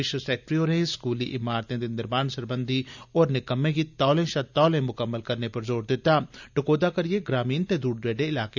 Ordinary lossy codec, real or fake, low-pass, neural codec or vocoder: none; real; 7.2 kHz; none